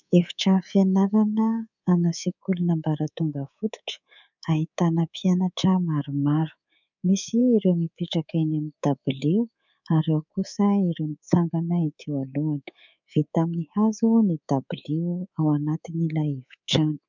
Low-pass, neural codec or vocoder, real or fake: 7.2 kHz; codec, 24 kHz, 3.1 kbps, DualCodec; fake